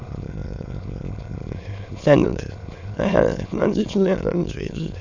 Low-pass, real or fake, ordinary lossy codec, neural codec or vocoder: 7.2 kHz; fake; MP3, 64 kbps; autoencoder, 22.05 kHz, a latent of 192 numbers a frame, VITS, trained on many speakers